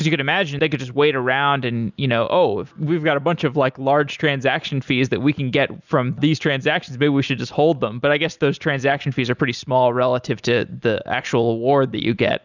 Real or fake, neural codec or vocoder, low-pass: real; none; 7.2 kHz